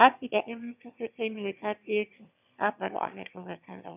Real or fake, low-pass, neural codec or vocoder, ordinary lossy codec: fake; 3.6 kHz; autoencoder, 22.05 kHz, a latent of 192 numbers a frame, VITS, trained on one speaker; none